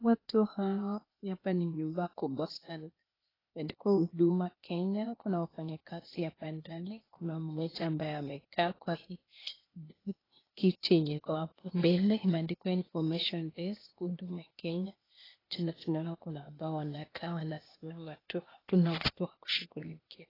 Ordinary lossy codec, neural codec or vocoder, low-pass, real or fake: AAC, 24 kbps; codec, 16 kHz, 0.8 kbps, ZipCodec; 5.4 kHz; fake